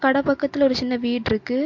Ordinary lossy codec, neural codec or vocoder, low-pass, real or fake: MP3, 48 kbps; none; 7.2 kHz; real